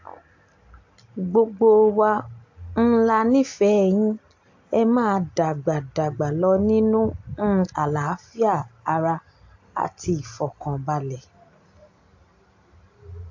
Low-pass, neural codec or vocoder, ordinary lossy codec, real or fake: 7.2 kHz; none; AAC, 48 kbps; real